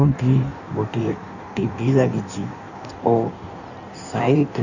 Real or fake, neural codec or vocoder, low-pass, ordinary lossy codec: fake; codec, 16 kHz in and 24 kHz out, 1.1 kbps, FireRedTTS-2 codec; 7.2 kHz; none